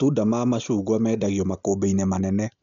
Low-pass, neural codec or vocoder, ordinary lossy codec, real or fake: 7.2 kHz; none; none; real